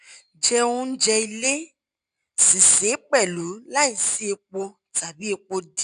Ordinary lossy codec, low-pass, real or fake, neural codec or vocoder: none; 9.9 kHz; real; none